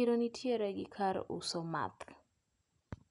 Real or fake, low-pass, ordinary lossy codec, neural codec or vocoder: real; 10.8 kHz; none; none